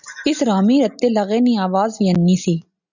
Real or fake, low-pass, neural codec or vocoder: real; 7.2 kHz; none